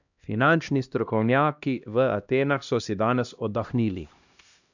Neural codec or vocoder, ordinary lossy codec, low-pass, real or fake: codec, 16 kHz, 1 kbps, X-Codec, HuBERT features, trained on LibriSpeech; none; 7.2 kHz; fake